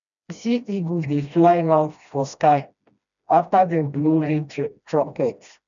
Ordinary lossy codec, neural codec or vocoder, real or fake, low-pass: none; codec, 16 kHz, 1 kbps, FreqCodec, smaller model; fake; 7.2 kHz